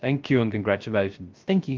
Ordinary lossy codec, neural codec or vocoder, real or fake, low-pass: Opus, 32 kbps; codec, 16 kHz, 0.3 kbps, FocalCodec; fake; 7.2 kHz